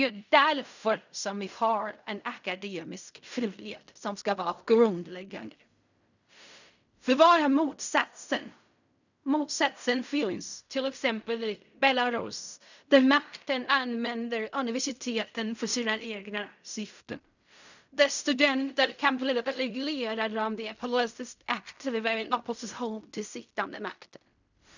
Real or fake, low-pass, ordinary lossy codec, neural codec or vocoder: fake; 7.2 kHz; none; codec, 16 kHz in and 24 kHz out, 0.4 kbps, LongCat-Audio-Codec, fine tuned four codebook decoder